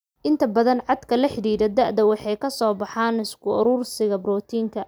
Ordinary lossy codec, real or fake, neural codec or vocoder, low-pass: none; real; none; none